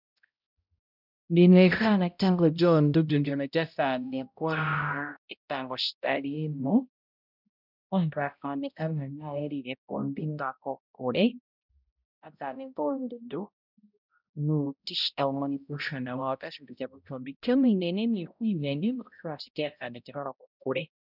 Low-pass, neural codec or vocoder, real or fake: 5.4 kHz; codec, 16 kHz, 0.5 kbps, X-Codec, HuBERT features, trained on balanced general audio; fake